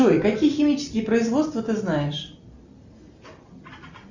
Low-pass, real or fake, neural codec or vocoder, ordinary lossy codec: 7.2 kHz; real; none; Opus, 64 kbps